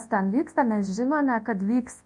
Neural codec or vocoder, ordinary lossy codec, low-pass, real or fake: codec, 24 kHz, 0.9 kbps, WavTokenizer, large speech release; MP3, 48 kbps; 10.8 kHz; fake